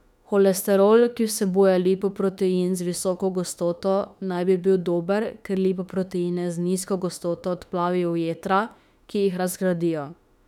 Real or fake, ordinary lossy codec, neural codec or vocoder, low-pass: fake; none; autoencoder, 48 kHz, 32 numbers a frame, DAC-VAE, trained on Japanese speech; 19.8 kHz